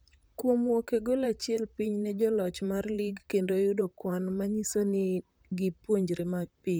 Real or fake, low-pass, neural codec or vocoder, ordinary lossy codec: fake; none; vocoder, 44.1 kHz, 128 mel bands, Pupu-Vocoder; none